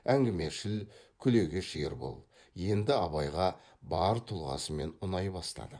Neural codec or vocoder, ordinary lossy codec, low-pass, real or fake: vocoder, 24 kHz, 100 mel bands, Vocos; none; 9.9 kHz; fake